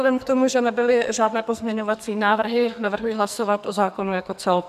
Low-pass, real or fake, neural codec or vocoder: 14.4 kHz; fake; codec, 44.1 kHz, 2.6 kbps, SNAC